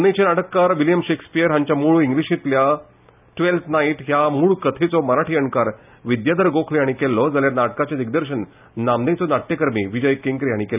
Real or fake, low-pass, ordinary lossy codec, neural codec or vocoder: real; 3.6 kHz; none; none